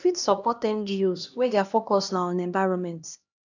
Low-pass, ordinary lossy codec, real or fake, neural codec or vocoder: 7.2 kHz; none; fake; codec, 16 kHz, 1 kbps, X-Codec, HuBERT features, trained on LibriSpeech